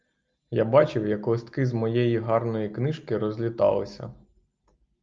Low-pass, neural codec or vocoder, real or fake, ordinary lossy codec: 7.2 kHz; none; real; Opus, 24 kbps